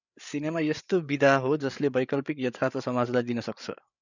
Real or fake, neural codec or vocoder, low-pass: fake; codec, 16 kHz, 8 kbps, FreqCodec, larger model; 7.2 kHz